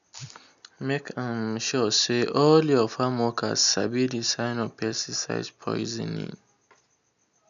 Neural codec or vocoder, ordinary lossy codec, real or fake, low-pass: none; none; real; 7.2 kHz